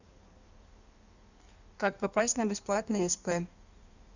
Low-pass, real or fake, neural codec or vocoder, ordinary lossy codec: 7.2 kHz; fake; codec, 16 kHz in and 24 kHz out, 1.1 kbps, FireRedTTS-2 codec; none